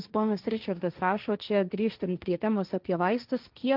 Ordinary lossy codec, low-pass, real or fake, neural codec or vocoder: Opus, 32 kbps; 5.4 kHz; fake; codec, 16 kHz, 1.1 kbps, Voila-Tokenizer